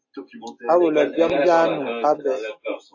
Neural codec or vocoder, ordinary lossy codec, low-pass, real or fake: none; AAC, 48 kbps; 7.2 kHz; real